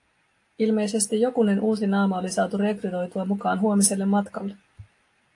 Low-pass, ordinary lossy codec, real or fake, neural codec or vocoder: 10.8 kHz; AAC, 48 kbps; real; none